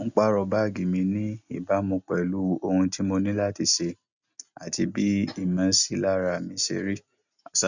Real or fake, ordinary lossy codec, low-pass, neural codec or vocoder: real; none; 7.2 kHz; none